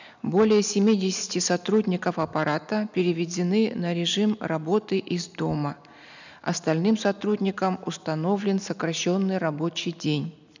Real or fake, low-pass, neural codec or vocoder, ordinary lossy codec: real; 7.2 kHz; none; none